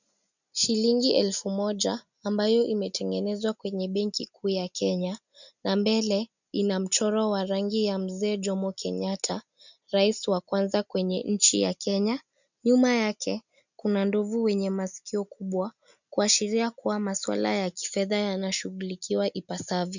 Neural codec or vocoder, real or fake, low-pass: none; real; 7.2 kHz